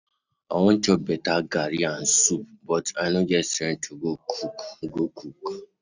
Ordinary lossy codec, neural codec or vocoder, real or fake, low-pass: none; none; real; 7.2 kHz